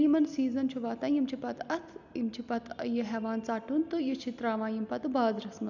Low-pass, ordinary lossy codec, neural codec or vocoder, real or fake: 7.2 kHz; none; none; real